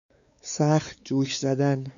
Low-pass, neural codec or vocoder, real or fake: 7.2 kHz; codec, 16 kHz, 4 kbps, X-Codec, WavLM features, trained on Multilingual LibriSpeech; fake